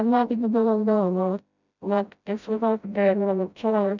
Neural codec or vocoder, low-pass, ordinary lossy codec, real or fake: codec, 16 kHz, 0.5 kbps, FreqCodec, smaller model; 7.2 kHz; none; fake